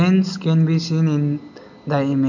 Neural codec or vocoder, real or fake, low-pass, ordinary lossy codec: none; real; 7.2 kHz; none